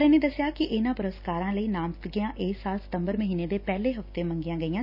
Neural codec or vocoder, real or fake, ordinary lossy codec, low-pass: none; real; none; 5.4 kHz